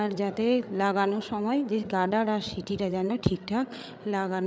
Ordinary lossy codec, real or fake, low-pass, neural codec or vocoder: none; fake; none; codec, 16 kHz, 8 kbps, FreqCodec, larger model